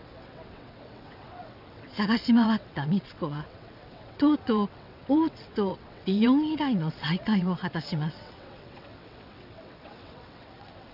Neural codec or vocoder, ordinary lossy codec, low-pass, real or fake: vocoder, 22.05 kHz, 80 mel bands, WaveNeXt; none; 5.4 kHz; fake